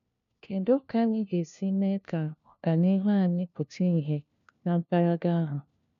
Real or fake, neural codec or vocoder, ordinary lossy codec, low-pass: fake; codec, 16 kHz, 1 kbps, FunCodec, trained on LibriTTS, 50 frames a second; none; 7.2 kHz